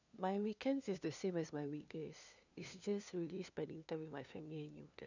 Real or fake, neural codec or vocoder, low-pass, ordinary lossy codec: fake; codec, 16 kHz, 2 kbps, FunCodec, trained on LibriTTS, 25 frames a second; 7.2 kHz; none